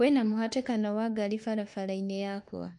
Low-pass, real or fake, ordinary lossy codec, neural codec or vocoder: 10.8 kHz; fake; MP3, 48 kbps; autoencoder, 48 kHz, 32 numbers a frame, DAC-VAE, trained on Japanese speech